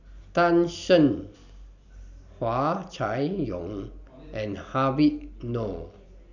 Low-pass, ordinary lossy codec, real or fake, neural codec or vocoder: 7.2 kHz; none; real; none